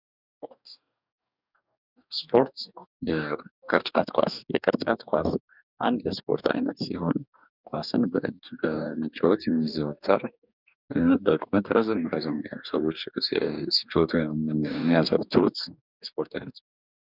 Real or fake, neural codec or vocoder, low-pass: fake; codec, 44.1 kHz, 2.6 kbps, DAC; 5.4 kHz